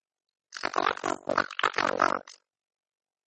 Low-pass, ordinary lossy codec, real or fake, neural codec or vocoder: 10.8 kHz; MP3, 32 kbps; real; none